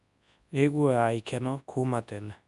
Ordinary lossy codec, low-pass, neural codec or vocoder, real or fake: none; 10.8 kHz; codec, 24 kHz, 0.9 kbps, WavTokenizer, large speech release; fake